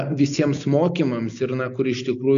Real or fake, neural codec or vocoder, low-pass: real; none; 7.2 kHz